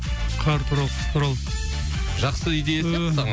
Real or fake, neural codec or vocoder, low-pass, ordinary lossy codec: real; none; none; none